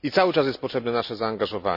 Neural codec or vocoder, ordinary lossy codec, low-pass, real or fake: none; none; 5.4 kHz; real